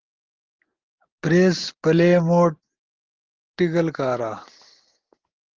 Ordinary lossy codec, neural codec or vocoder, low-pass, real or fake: Opus, 16 kbps; none; 7.2 kHz; real